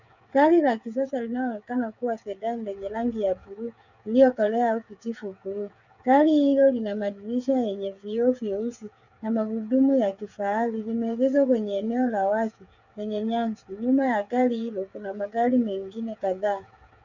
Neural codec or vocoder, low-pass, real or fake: codec, 16 kHz, 8 kbps, FreqCodec, smaller model; 7.2 kHz; fake